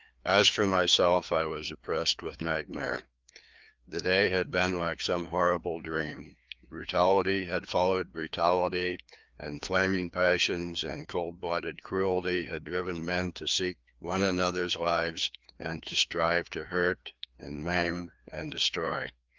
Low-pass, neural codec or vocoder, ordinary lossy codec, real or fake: 7.2 kHz; codec, 16 kHz, 2 kbps, FreqCodec, larger model; Opus, 32 kbps; fake